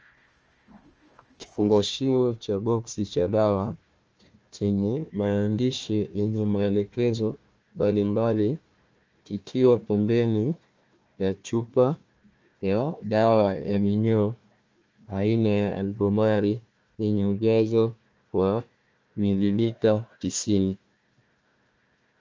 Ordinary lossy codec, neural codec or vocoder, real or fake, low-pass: Opus, 24 kbps; codec, 16 kHz, 1 kbps, FunCodec, trained on Chinese and English, 50 frames a second; fake; 7.2 kHz